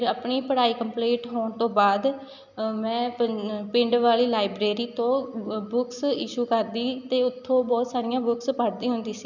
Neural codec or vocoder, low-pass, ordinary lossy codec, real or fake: none; 7.2 kHz; none; real